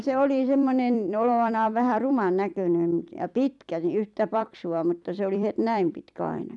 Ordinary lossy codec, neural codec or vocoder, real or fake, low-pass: none; vocoder, 44.1 kHz, 128 mel bands every 256 samples, BigVGAN v2; fake; 10.8 kHz